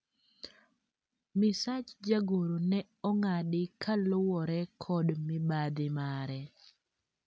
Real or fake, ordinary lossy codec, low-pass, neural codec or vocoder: real; none; none; none